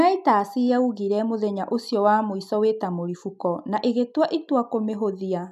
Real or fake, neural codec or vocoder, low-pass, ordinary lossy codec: real; none; 14.4 kHz; none